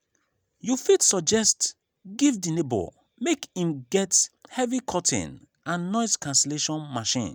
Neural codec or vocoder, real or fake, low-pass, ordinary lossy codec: none; real; none; none